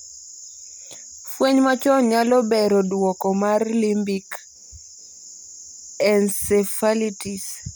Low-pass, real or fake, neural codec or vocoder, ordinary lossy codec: none; real; none; none